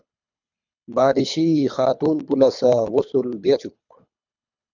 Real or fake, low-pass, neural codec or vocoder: fake; 7.2 kHz; codec, 24 kHz, 3 kbps, HILCodec